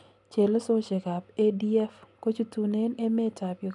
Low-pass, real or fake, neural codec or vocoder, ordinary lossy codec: 10.8 kHz; real; none; none